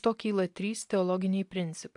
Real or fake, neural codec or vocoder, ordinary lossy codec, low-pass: real; none; AAC, 64 kbps; 10.8 kHz